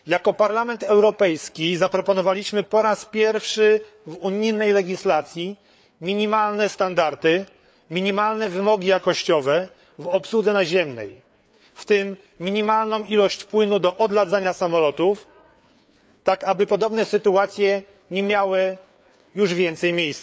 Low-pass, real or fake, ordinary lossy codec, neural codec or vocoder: none; fake; none; codec, 16 kHz, 4 kbps, FreqCodec, larger model